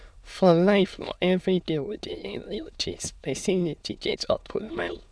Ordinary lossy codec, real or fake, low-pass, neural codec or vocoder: none; fake; none; autoencoder, 22.05 kHz, a latent of 192 numbers a frame, VITS, trained on many speakers